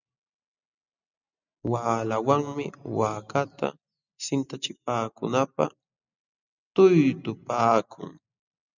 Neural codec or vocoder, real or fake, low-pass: none; real; 7.2 kHz